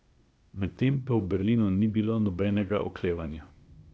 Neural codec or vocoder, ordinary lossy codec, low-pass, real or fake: codec, 16 kHz, 0.8 kbps, ZipCodec; none; none; fake